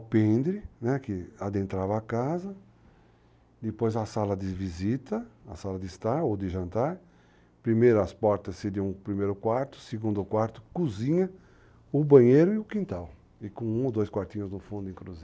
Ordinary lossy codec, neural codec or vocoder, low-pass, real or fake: none; none; none; real